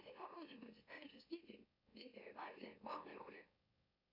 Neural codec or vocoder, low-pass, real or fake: autoencoder, 44.1 kHz, a latent of 192 numbers a frame, MeloTTS; 5.4 kHz; fake